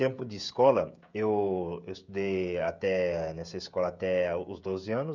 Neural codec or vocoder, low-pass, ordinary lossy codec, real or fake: codec, 16 kHz, 16 kbps, FreqCodec, smaller model; 7.2 kHz; none; fake